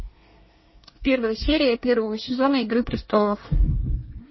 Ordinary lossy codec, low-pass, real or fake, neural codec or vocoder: MP3, 24 kbps; 7.2 kHz; fake; codec, 24 kHz, 1 kbps, SNAC